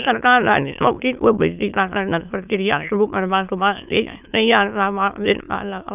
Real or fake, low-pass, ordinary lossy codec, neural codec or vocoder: fake; 3.6 kHz; none; autoencoder, 22.05 kHz, a latent of 192 numbers a frame, VITS, trained on many speakers